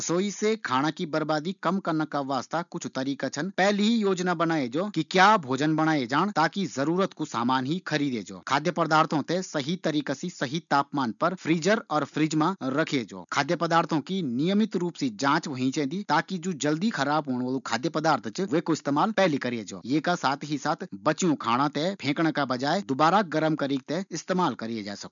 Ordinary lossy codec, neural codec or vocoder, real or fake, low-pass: none; none; real; 7.2 kHz